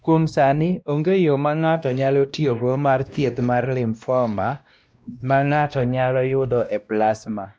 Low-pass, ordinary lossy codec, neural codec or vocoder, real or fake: none; none; codec, 16 kHz, 1 kbps, X-Codec, WavLM features, trained on Multilingual LibriSpeech; fake